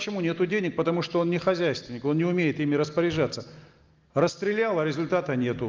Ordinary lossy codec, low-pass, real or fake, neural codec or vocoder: Opus, 32 kbps; 7.2 kHz; real; none